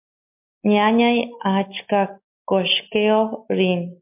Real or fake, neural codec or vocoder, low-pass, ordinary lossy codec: real; none; 3.6 kHz; MP3, 32 kbps